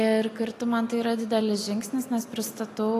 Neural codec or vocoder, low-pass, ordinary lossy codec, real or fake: none; 14.4 kHz; AAC, 48 kbps; real